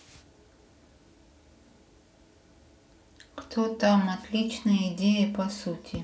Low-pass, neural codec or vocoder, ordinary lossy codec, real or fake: none; none; none; real